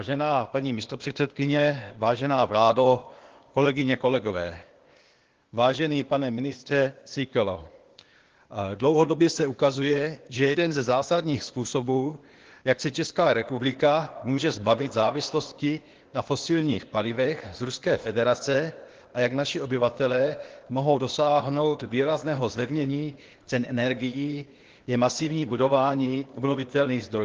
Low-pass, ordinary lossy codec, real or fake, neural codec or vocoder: 7.2 kHz; Opus, 16 kbps; fake; codec, 16 kHz, 0.8 kbps, ZipCodec